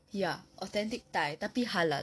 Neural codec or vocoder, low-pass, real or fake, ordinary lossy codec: none; none; real; none